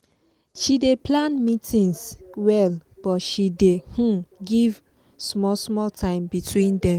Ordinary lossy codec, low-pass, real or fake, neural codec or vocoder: Opus, 24 kbps; 19.8 kHz; real; none